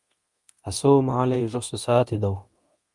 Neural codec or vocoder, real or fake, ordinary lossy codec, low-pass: codec, 24 kHz, 0.9 kbps, DualCodec; fake; Opus, 32 kbps; 10.8 kHz